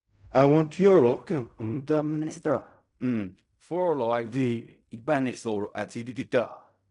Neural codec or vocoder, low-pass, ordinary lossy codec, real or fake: codec, 16 kHz in and 24 kHz out, 0.4 kbps, LongCat-Audio-Codec, fine tuned four codebook decoder; 10.8 kHz; none; fake